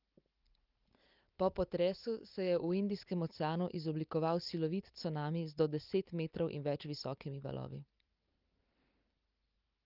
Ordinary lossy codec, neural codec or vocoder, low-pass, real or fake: Opus, 32 kbps; none; 5.4 kHz; real